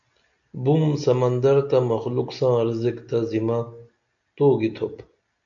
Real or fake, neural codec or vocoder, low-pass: real; none; 7.2 kHz